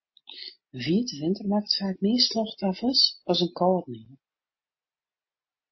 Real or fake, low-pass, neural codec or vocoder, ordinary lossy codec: real; 7.2 kHz; none; MP3, 24 kbps